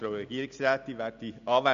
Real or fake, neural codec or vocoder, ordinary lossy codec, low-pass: real; none; none; 7.2 kHz